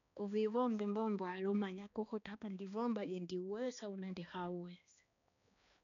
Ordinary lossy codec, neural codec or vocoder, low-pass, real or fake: none; codec, 16 kHz, 2 kbps, X-Codec, HuBERT features, trained on balanced general audio; 7.2 kHz; fake